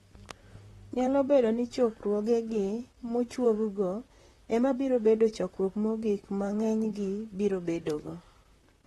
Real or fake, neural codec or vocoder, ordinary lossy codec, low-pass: fake; vocoder, 44.1 kHz, 128 mel bands, Pupu-Vocoder; AAC, 32 kbps; 19.8 kHz